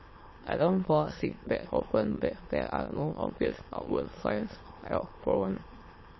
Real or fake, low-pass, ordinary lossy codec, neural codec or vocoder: fake; 7.2 kHz; MP3, 24 kbps; autoencoder, 22.05 kHz, a latent of 192 numbers a frame, VITS, trained on many speakers